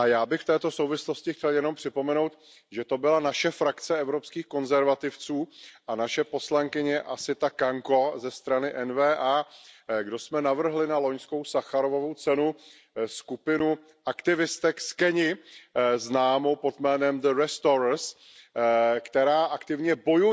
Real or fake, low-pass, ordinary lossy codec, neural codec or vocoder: real; none; none; none